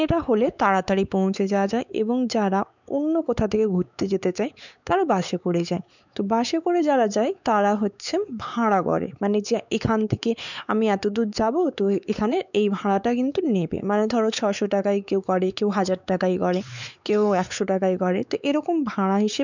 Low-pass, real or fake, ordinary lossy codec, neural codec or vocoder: 7.2 kHz; fake; none; codec, 16 kHz, 4 kbps, X-Codec, WavLM features, trained on Multilingual LibriSpeech